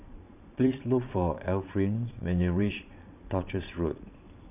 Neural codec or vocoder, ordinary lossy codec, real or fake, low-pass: vocoder, 22.05 kHz, 80 mel bands, Vocos; none; fake; 3.6 kHz